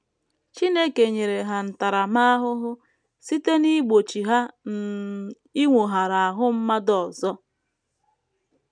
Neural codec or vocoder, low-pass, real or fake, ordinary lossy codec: none; 9.9 kHz; real; none